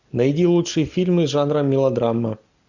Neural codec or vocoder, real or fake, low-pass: none; real; 7.2 kHz